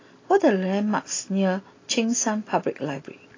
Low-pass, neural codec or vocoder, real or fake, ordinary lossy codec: 7.2 kHz; none; real; AAC, 32 kbps